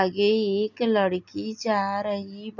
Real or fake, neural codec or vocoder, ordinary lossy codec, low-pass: real; none; none; 7.2 kHz